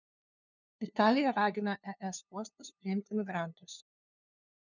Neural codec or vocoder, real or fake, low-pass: codec, 16 kHz, 2 kbps, FunCodec, trained on LibriTTS, 25 frames a second; fake; 7.2 kHz